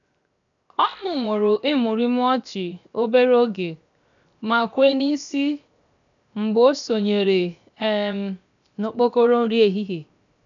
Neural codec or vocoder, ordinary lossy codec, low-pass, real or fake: codec, 16 kHz, 0.7 kbps, FocalCodec; none; 7.2 kHz; fake